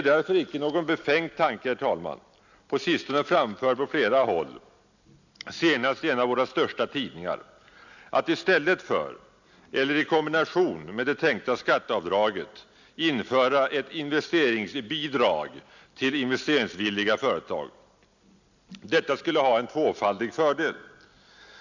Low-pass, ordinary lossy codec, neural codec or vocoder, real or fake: 7.2 kHz; none; none; real